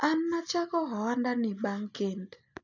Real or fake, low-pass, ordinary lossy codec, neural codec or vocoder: real; 7.2 kHz; none; none